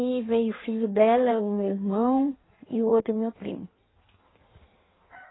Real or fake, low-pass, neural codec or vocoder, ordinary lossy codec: fake; 7.2 kHz; codec, 16 kHz in and 24 kHz out, 1.1 kbps, FireRedTTS-2 codec; AAC, 16 kbps